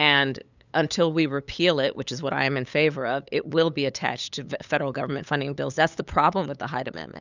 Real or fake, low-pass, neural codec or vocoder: fake; 7.2 kHz; codec, 16 kHz, 8 kbps, FunCodec, trained on LibriTTS, 25 frames a second